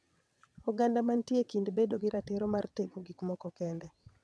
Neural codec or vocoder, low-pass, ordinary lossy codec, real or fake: vocoder, 22.05 kHz, 80 mel bands, WaveNeXt; none; none; fake